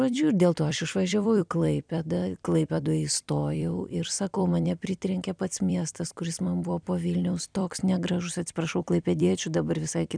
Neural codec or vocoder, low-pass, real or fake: vocoder, 44.1 kHz, 128 mel bands every 256 samples, BigVGAN v2; 9.9 kHz; fake